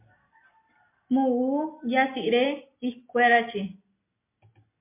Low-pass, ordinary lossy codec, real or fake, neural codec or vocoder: 3.6 kHz; MP3, 24 kbps; real; none